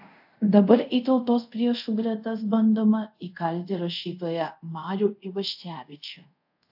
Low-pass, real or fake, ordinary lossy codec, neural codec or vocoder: 5.4 kHz; fake; AAC, 48 kbps; codec, 24 kHz, 0.5 kbps, DualCodec